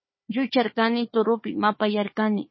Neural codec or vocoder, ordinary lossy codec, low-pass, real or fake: codec, 16 kHz, 4 kbps, FunCodec, trained on Chinese and English, 50 frames a second; MP3, 24 kbps; 7.2 kHz; fake